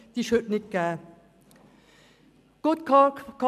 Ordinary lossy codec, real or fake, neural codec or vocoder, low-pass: none; real; none; 14.4 kHz